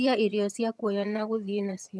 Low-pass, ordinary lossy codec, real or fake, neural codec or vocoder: none; none; fake; vocoder, 22.05 kHz, 80 mel bands, HiFi-GAN